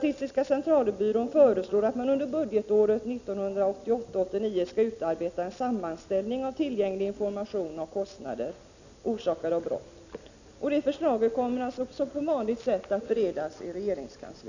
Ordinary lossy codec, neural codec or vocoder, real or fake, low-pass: none; none; real; 7.2 kHz